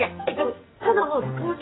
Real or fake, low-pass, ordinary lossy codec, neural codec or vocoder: fake; 7.2 kHz; AAC, 16 kbps; codec, 44.1 kHz, 2.6 kbps, SNAC